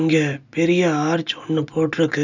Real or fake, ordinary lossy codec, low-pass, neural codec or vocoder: real; none; 7.2 kHz; none